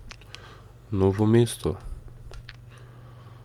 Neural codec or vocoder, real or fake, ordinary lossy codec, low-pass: none; real; Opus, 32 kbps; 19.8 kHz